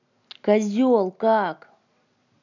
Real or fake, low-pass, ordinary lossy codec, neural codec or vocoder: real; 7.2 kHz; none; none